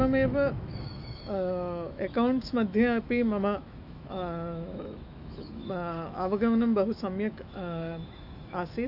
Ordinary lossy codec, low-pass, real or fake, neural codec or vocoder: AAC, 48 kbps; 5.4 kHz; fake; autoencoder, 48 kHz, 128 numbers a frame, DAC-VAE, trained on Japanese speech